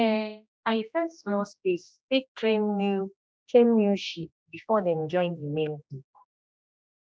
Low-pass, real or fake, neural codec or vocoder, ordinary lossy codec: none; fake; codec, 16 kHz, 1 kbps, X-Codec, HuBERT features, trained on general audio; none